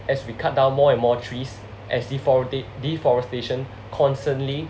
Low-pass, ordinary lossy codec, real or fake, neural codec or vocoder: none; none; real; none